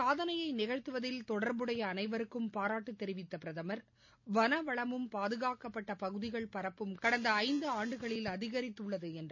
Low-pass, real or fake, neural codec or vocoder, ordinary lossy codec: 7.2 kHz; real; none; MP3, 48 kbps